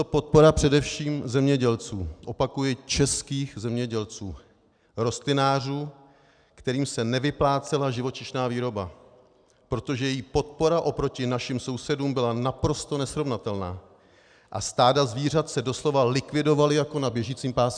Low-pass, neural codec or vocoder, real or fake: 9.9 kHz; none; real